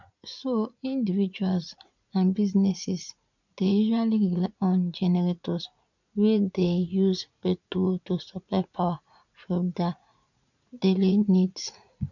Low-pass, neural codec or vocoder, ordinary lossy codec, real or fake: 7.2 kHz; vocoder, 44.1 kHz, 80 mel bands, Vocos; none; fake